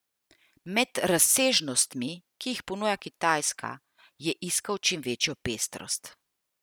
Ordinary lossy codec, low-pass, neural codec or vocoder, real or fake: none; none; none; real